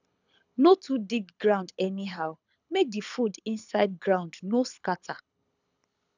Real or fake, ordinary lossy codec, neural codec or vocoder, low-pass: fake; none; codec, 24 kHz, 6 kbps, HILCodec; 7.2 kHz